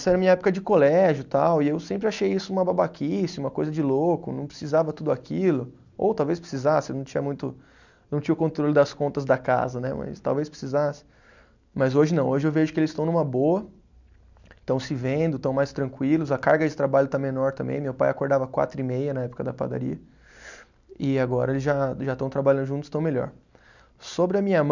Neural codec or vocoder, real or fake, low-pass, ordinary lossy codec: none; real; 7.2 kHz; none